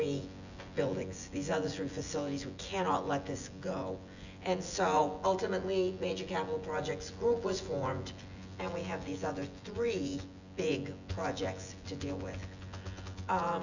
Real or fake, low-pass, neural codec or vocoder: fake; 7.2 kHz; vocoder, 24 kHz, 100 mel bands, Vocos